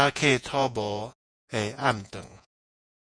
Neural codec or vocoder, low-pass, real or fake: vocoder, 48 kHz, 128 mel bands, Vocos; 9.9 kHz; fake